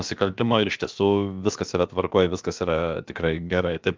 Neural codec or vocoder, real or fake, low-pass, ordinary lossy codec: codec, 16 kHz, about 1 kbps, DyCAST, with the encoder's durations; fake; 7.2 kHz; Opus, 32 kbps